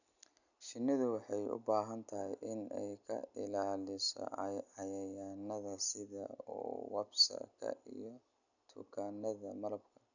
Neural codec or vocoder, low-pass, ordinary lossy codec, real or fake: none; 7.2 kHz; none; real